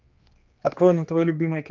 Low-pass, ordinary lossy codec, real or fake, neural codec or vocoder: 7.2 kHz; Opus, 24 kbps; fake; codec, 16 kHz, 2 kbps, X-Codec, HuBERT features, trained on general audio